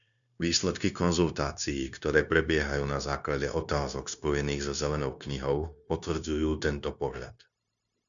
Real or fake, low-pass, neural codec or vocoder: fake; 7.2 kHz; codec, 16 kHz, 0.9 kbps, LongCat-Audio-Codec